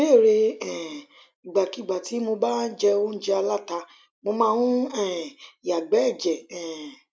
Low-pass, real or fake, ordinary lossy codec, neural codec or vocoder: none; real; none; none